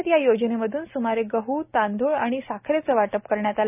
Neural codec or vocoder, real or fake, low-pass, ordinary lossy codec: none; real; 3.6 kHz; none